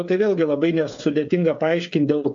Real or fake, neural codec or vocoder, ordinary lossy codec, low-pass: fake; codec, 16 kHz, 8 kbps, FreqCodec, smaller model; AAC, 64 kbps; 7.2 kHz